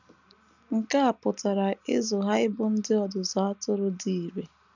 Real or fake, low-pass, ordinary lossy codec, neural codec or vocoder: real; 7.2 kHz; none; none